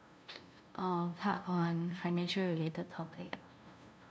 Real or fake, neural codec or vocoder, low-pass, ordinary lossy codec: fake; codec, 16 kHz, 0.5 kbps, FunCodec, trained on LibriTTS, 25 frames a second; none; none